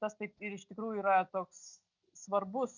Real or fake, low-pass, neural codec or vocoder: real; 7.2 kHz; none